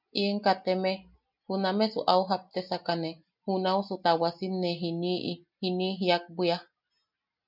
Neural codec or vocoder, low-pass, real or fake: none; 5.4 kHz; real